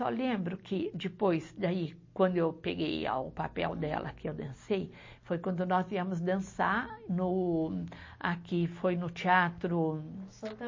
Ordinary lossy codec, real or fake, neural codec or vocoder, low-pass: MP3, 32 kbps; real; none; 7.2 kHz